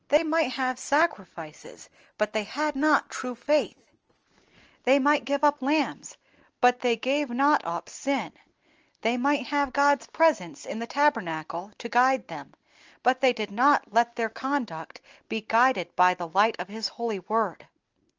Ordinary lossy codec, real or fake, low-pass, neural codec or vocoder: Opus, 24 kbps; real; 7.2 kHz; none